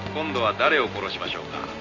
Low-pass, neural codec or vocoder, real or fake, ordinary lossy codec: 7.2 kHz; none; real; none